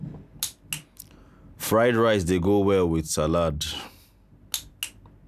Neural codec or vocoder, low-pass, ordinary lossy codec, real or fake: none; 14.4 kHz; none; real